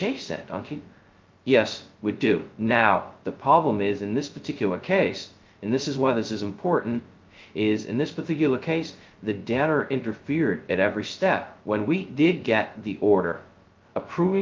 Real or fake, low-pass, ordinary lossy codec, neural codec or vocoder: fake; 7.2 kHz; Opus, 32 kbps; codec, 16 kHz, 0.2 kbps, FocalCodec